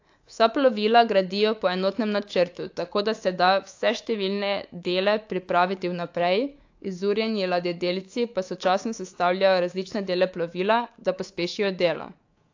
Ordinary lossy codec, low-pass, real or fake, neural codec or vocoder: AAC, 48 kbps; 7.2 kHz; fake; codec, 24 kHz, 3.1 kbps, DualCodec